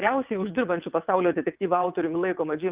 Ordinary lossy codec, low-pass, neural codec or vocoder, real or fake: Opus, 24 kbps; 3.6 kHz; vocoder, 22.05 kHz, 80 mel bands, WaveNeXt; fake